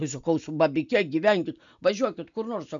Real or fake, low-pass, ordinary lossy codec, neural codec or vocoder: real; 7.2 kHz; MP3, 96 kbps; none